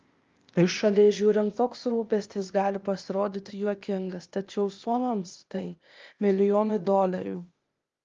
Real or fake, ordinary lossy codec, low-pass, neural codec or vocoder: fake; Opus, 32 kbps; 7.2 kHz; codec, 16 kHz, 0.8 kbps, ZipCodec